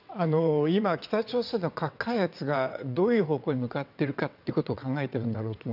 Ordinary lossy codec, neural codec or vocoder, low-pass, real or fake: none; vocoder, 22.05 kHz, 80 mel bands, WaveNeXt; 5.4 kHz; fake